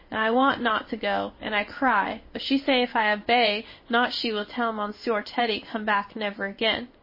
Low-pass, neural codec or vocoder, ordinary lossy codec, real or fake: 5.4 kHz; codec, 16 kHz in and 24 kHz out, 1 kbps, XY-Tokenizer; MP3, 24 kbps; fake